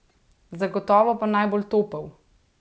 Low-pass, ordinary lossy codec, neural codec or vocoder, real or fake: none; none; none; real